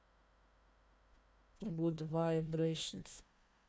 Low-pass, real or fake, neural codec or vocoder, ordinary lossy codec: none; fake; codec, 16 kHz, 1 kbps, FunCodec, trained on Chinese and English, 50 frames a second; none